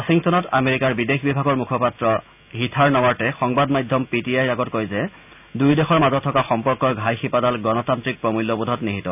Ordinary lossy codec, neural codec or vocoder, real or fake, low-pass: none; none; real; 3.6 kHz